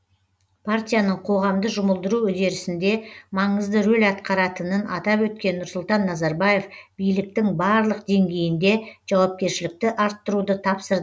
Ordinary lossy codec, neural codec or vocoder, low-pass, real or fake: none; none; none; real